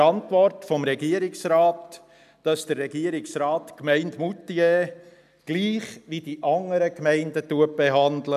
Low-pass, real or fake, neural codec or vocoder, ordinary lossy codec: 14.4 kHz; real; none; none